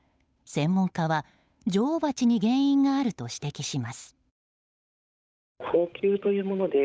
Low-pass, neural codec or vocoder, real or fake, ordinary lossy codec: none; codec, 16 kHz, 8 kbps, FunCodec, trained on Chinese and English, 25 frames a second; fake; none